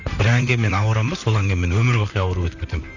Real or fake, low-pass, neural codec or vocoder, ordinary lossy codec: fake; 7.2 kHz; vocoder, 44.1 kHz, 128 mel bands, Pupu-Vocoder; none